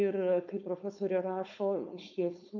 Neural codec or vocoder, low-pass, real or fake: codec, 16 kHz, 4.8 kbps, FACodec; 7.2 kHz; fake